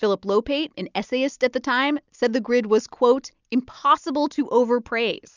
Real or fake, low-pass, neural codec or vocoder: real; 7.2 kHz; none